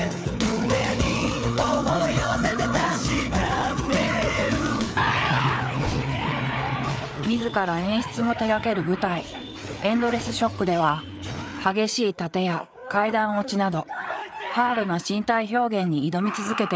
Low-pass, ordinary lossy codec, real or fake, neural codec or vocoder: none; none; fake; codec, 16 kHz, 4 kbps, FreqCodec, larger model